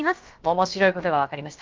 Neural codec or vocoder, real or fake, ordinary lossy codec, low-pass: codec, 16 kHz, about 1 kbps, DyCAST, with the encoder's durations; fake; Opus, 24 kbps; 7.2 kHz